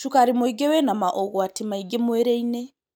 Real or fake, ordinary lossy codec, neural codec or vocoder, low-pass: real; none; none; none